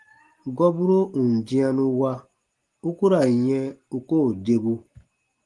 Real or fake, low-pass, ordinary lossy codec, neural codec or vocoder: real; 10.8 kHz; Opus, 24 kbps; none